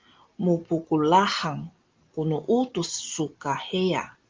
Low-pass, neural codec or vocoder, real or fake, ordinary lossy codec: 7.2 kHz; none; real; Opus, 32 kbps